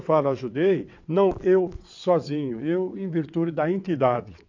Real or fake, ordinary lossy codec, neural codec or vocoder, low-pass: fake; AAC, 48 kbps; vocoder, 22.05 kHz, 80 mel bands, WaveNeXt; 7.2 kHz